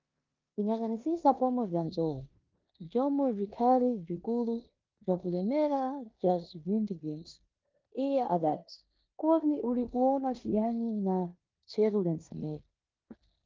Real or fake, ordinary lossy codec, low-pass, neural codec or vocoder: fake; Opus, 32 kbps; 7.2 kHz; codec, 16 kHz in and 24 kHz out, 0.9 kbps, LongCat-Audio-Codec, four codebook decoder